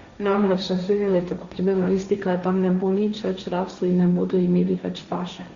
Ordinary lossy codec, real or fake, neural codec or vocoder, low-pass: Opus, 64 kbps; fake; codec, 16 kHz, 1.1 kbps, Voila-Tokenizer; 7.2 kHz